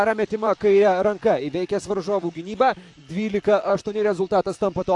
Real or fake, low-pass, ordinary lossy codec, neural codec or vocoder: fake; 10.8 kHz; AAC, 64 kbps; vocoder, 44.1 kHz, 128 mel bands, Pupu-Vocoder